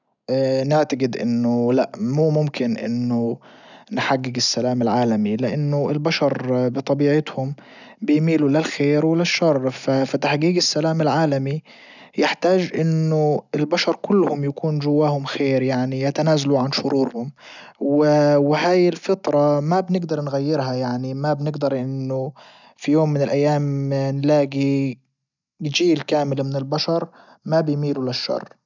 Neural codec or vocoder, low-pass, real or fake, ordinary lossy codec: none; 7.2 kHz; real; none